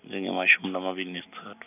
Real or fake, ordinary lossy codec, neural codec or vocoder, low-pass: real; none; none; 3.6 kHz